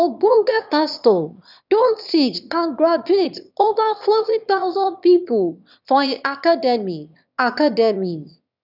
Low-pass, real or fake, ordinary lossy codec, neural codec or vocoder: 5.4 kHz; fake; none; autoencoder, 22.05 kHz, a latent of 192 numbers a frame, VITS, trained on one speaker